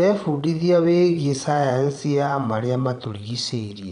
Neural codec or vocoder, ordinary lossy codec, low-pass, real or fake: vocoder, 22.05 kHz, 80 mel bands, Vocos; none; 9.9 kHz; fake